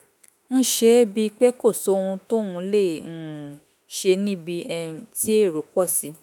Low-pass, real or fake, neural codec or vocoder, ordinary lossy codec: none; fake; autoencoder, 48 kHz, 32 numbers a frame, DAC-VAE, trained on Japanese speech; none